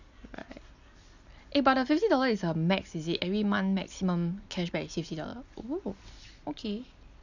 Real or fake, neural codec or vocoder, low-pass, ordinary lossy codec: real; none; 7.2 kHz; none